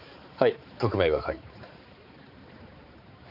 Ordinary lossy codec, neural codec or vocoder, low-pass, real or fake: none; codec, 16 kHz, 4 kbps, X-Codec, HuBERT features, trained on balanced general audio; 5.4 kHz; fake